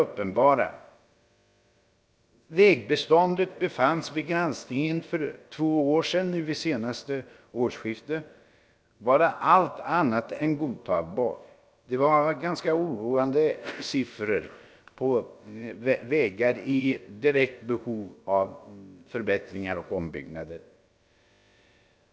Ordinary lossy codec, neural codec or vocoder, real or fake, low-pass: none; codec, 16 kHz, about 1 kbps, DyCAST, with the encoder's durations; fake; none